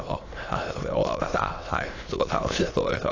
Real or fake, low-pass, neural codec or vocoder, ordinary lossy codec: fake; 7.2 kHz; autoencoder, 22.05 kHz, a latent of 192 numbers a frame, VITS, trained on many speakers; AAC, 32 kbps